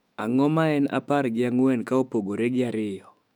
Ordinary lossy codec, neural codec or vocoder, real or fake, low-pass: none; autoencoder, 48 kHz, 128 numbers a frame, DAC-VAE, trained on Japanese speech; fake; 19.8 kHz